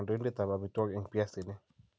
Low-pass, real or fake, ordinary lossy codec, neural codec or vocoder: none; real; none; none